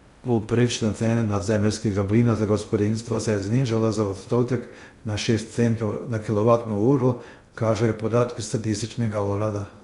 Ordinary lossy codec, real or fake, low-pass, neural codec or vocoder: Opus, 64 kbps; fake; 10.8 kHz; codec, 16 kHz in and 24 kHz out, 0.6 kbps, FocalCodec, streaming, 2048 codes